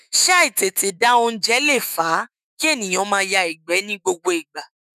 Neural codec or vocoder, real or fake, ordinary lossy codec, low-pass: autoencoder, 48 kHz, 128 numbers a frame, DAC-VAE, trained on Japanese speech; fake; none; 14.4 kHz